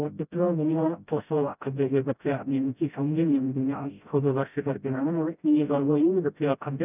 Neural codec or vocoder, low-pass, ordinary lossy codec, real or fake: codec, 16 kHz, 0.5 kbps, FreqCodec, smaller model; 3.6 kHz; none; fake